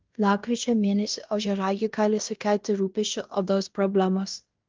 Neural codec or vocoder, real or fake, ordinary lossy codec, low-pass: codec, 16 kHz in and 24 kHz out, 0.9 kbps, LongCat-Audio-Codec, fine tuned four codebook decoder; fake; Opus, 32 kbps; 7.2 kHz